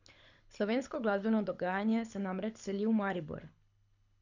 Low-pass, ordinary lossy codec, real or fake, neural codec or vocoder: 7.2 kHz; none; fake; codec, 24 kHz, 6 kbps, HILCodec